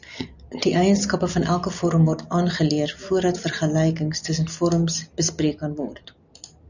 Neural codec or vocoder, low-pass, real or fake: none; 7.2 kHz; real